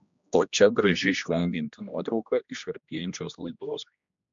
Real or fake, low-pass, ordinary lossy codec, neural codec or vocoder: fake; 7.2 kHz; MP3, 64 kbps; codec, 16 kHz, 1 kbps, X-Codec, HuBERT features, trained on general audio